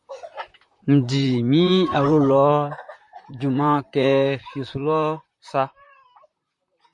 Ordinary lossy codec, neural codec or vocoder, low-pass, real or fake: MP3, 64 kbps; vocoder, 44.1 kHz, 128 mel bands, Pupu-Vocoder; 10.8 kHz; fake